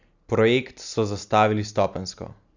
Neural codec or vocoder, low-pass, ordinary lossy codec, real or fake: none; 7.2 kHz; Opus, 64 kbps; real